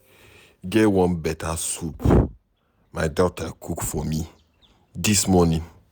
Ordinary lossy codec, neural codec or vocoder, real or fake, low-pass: none; none; real; none